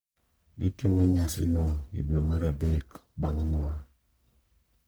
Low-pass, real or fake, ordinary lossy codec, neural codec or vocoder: none; fake; none; codec, 44.1 kHz, 1.7 kbps, Pupu-Codec